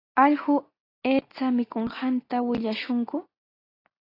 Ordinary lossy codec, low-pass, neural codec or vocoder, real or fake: AAC, 24 kbps; 5.4 kHz; none; real